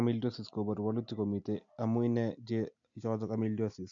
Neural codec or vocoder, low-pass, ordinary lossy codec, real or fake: none; 7.2 kHz; MP3, 96 kbps; real